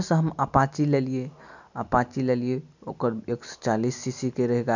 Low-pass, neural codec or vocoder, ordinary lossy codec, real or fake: 7.2 kHz; none; none; real